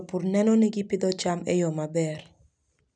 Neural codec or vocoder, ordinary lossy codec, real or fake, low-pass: none; none; real; 9.9 kHz